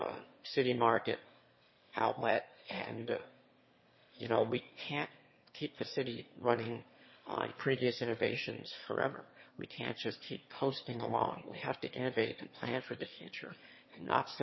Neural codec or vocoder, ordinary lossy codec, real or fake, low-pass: autoencoder, 22.05 kHz, a latent of 192 numbers a frame, VITS, trained on one speaker; MP3, 24 kbps; fake; 7.2 kHz